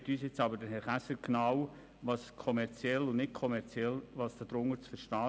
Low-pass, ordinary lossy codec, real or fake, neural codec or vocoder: none; none; real; none